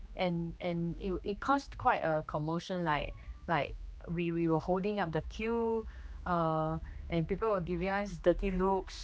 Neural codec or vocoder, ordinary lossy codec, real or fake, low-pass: codec, 16 kHz, 2 kbps, X-Codec, HuBERT features, trained on general audio; none; fake; none